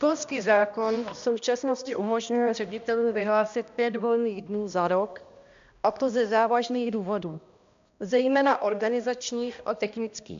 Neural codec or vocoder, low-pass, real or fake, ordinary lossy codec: codec, 16 kHz, 1 kbps, X-Codec, HuBERT features, trained on balanced general audio; 7.2 kHz; fake; MP3, 64 kbps